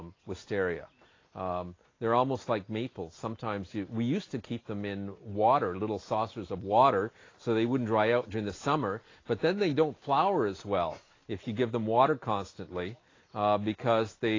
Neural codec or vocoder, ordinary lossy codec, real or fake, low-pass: none; AAC, 32 kbps; real; 7.2 kHz